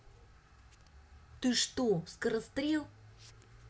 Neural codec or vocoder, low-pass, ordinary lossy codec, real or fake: none; none; none; real